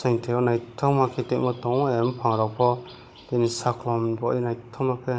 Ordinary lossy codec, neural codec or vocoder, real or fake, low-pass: none; codec, 16 kHz, 16 kbps, FunCodec, trained on Chinese and English, 50 frames a second; fake; none